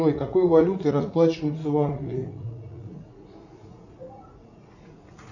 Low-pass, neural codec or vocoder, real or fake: 7.2 kHz; vocoder, 44.1 kHz, 80 mel bands, Vocos; fake